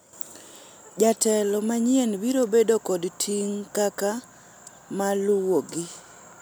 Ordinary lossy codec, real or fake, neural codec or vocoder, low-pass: none; real; none; none